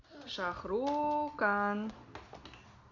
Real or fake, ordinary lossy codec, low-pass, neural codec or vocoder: real; Opus, 64 kbps; 7.2 kHz; none